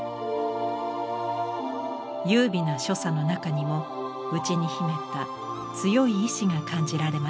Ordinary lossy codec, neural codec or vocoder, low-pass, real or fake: none; none; none; real